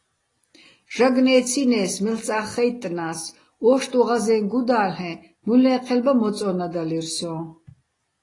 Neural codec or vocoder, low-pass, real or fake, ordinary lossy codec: none; 10.8 kHz; real; AAC, 32 kbps